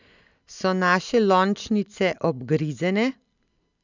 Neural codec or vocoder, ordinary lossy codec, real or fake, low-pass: none; none; real; 7.2 kHz